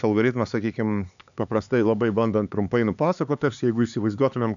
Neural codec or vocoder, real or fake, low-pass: codec, 16 kHz, 4 kbps, X-Codec, HuBERT features, trained on LibriSpeech; fake; 7.2 kHz